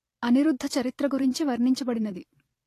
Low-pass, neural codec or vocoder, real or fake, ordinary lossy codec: 14.4 kHz; vocoder, 44.1 kHz, 128 mel bands every 256 samples, BigVGAN v2; fake; AAC, 48 kbps